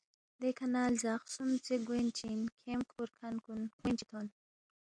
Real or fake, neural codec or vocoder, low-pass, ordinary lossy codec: real; none; 9.9 kHz; MP3, 96 kbps